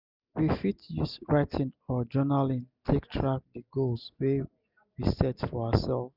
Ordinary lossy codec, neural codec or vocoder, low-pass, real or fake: none; none; 5.4 kHz; real